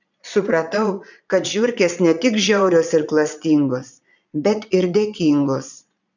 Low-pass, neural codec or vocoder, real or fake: 7.2 kHz; vocoder, 22.05 kHz, 80 mel bands, Vocos; fake